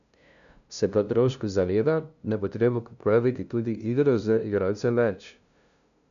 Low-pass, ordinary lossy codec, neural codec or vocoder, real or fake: 7.2 kHz; none; codec, 16 kHz, 0.5 kbps, FunCodec, trained on LibriTTS, 25 frames a second; fake